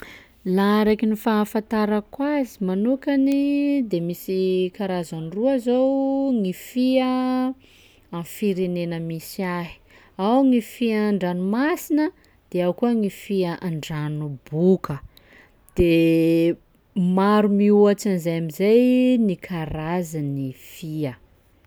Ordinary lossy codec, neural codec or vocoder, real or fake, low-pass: none; none; real; none